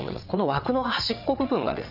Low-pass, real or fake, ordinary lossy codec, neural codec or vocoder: 5.4 kHz; fake; none; vocoder, 22.05 kHz, 80 mel bands, WaveNeXt